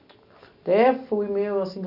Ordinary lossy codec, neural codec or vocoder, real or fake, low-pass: none; none; real; 5.4 kHz